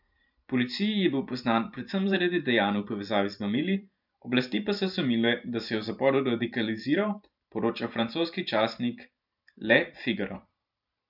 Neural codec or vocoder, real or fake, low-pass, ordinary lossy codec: vocoder, 44.1 kHz, 128 mel bands every 512 samples, BigVGAN v2; fake; 5.4 kHz; none